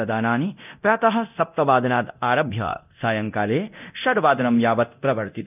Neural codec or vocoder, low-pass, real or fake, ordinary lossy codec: codec, 24 kHz, 0.9 kbps, DualCodec; 3.6 kHz; fake; none